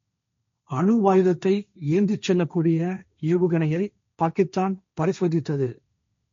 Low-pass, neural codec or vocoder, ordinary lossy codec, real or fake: 7.2 kHz; codec, 16 kHz, 1.1 kbps, Voila-Tokenizer; MP3, 48 kbps; fake